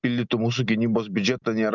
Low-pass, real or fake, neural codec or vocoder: 7.2 kHz; real; none